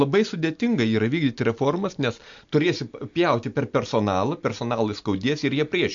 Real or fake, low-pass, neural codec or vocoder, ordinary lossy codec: real; 7.2 kHz; none; MP3, 48 kbps